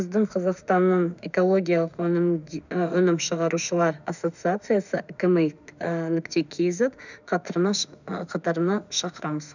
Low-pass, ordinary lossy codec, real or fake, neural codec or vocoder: 7.2 kHz; none; fake; autoencoder, 48 kHz, 32 numbers a frame, DAC-VAE, trained on Japanese speech